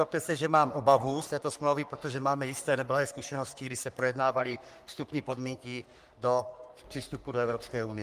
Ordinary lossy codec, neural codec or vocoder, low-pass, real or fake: Opus, 32 kbps; codec, 44.1 kHz, 3.4 kbps, Pupu-Codec; 14.4 kHz; fake